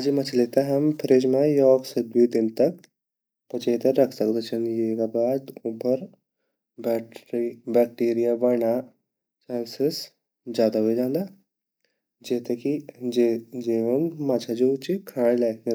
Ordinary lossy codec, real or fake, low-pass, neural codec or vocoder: none; real; none; none